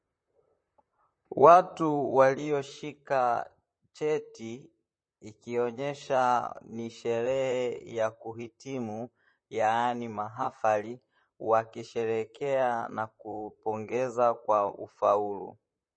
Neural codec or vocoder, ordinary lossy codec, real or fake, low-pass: vocoder, 44.1 kHz, 128 mel bands, Pupu-Vocoder; MP3, 32 kbps; fake; 9.9 kHz